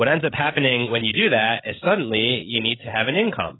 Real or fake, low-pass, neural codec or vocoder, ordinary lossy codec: fake; 7.2 kHz; codec, 16 kHz, 8 kbps, FreqCodec, larger model; AAC, 16 kbps